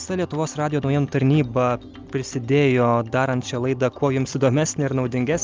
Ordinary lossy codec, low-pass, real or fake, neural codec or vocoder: Opus, 24 kbps; 7.2 kHz; real; none